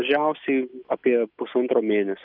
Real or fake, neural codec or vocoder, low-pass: real; none; 5.4 kHz